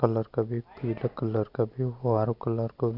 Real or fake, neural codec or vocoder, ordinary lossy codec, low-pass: real; none; none; 5.4 kHz